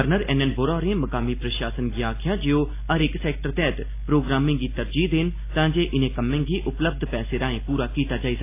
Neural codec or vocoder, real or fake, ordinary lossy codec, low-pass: none; real; AAC, 24 kbps; 3.6 kHz